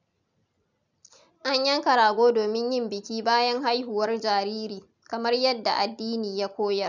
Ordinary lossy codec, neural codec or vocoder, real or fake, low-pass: none; none; real; 7.2 kHz